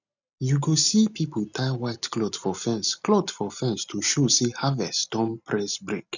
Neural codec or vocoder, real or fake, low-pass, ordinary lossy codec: none; real; 7.2 kHz; none